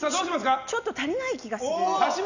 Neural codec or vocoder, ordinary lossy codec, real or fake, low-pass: none; none; real; 7.2 kHz